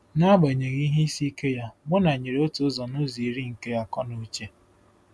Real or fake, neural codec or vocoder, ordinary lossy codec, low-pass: real; none; none; none